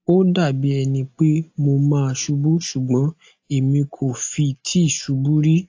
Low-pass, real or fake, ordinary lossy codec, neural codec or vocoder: 7.2 kHz; real; AAC, 48 kbps; none